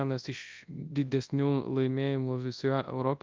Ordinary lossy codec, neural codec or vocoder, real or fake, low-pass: Opus, 24 kbps; codec, 24 kHz, 0.9 kbps, WavTokenizer, large speech release; fake; 7.2 kHz